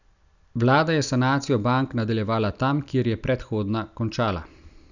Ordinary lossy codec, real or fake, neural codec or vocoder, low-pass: none; real; none; 7.2 kHz